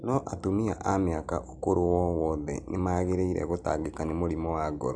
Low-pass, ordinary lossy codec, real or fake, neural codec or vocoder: 9.9 kHz; none; real; none